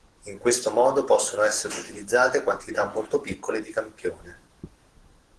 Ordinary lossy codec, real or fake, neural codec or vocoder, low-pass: Opus, 16 kbps; fake; codec, 44.1 kHz, 7.8 kbps, DAC; 10.8 kHz